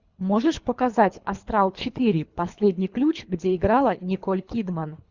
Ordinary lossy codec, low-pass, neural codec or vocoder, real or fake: Opus, 64 kbps; 7.2 kHz; codec, 24 kHz, 3 kbps, HILCodec; fake